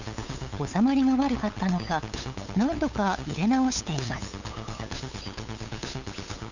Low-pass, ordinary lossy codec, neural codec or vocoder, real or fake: 7.2 kHz; none; codec, 16 kHz, 8 kbps, FunCodec, trained on LibriTTS, 25 frames a second; fake